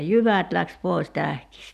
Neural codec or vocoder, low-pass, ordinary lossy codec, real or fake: none; 14.4 kHz; none; real